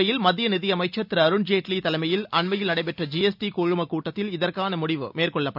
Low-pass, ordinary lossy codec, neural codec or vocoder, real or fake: 5.4 kHz; none; none; real